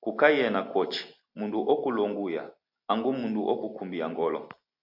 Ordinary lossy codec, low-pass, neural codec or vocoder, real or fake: AAC, 48 kbps; 5.4 kHz; none; real